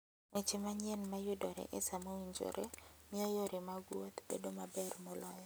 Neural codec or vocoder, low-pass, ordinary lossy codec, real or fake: none; none; none; real